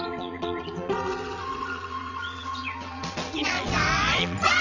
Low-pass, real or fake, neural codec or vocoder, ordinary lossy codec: 7.2 kHz; fake; vocoder, 22.05 kHz, 80 mel bands, WaveNeXt; none